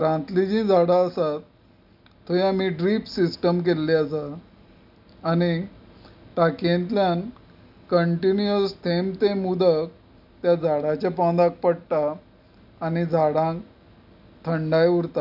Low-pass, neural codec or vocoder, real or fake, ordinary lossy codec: 5.4 kHz; none; real; none